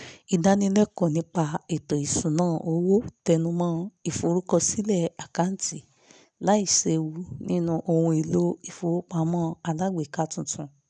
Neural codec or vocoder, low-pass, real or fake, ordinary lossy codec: none; 9.9 kHz; real; none